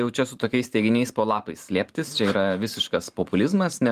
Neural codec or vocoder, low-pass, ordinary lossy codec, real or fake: vocoder, 44.1 kHz, 128 mel bands every 512 samples, BigVGAN v2; 14.4 kHz; Opus, 24 kbps; fake